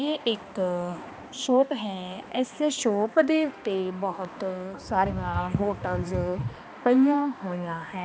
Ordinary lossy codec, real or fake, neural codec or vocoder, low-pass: none; fake; codec, 16 kHz, 2 kbps, X-Codec, HuBERT features, trained on balanced general audio; none